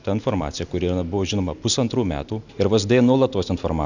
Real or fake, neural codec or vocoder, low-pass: real; none; 7.2 kHz